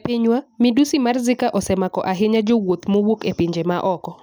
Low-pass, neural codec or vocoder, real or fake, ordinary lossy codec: none; none; real; none